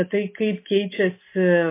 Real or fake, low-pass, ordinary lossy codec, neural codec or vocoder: real; 3.6 kHz; MP3, 24 kbps; none